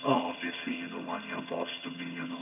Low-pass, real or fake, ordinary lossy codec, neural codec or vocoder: 3.6 kHz; fake; none; vocoder, 22.05 kHz, 80 mel bands, HiFi-GAN